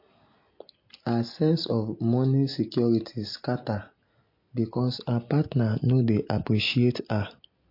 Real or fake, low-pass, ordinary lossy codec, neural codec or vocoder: real; 5.4 kHz; MP3, 32 kbps; none